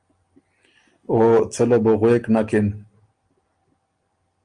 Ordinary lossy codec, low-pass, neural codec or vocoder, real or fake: Opus, 32 kbps; 9.9 kHz; none; real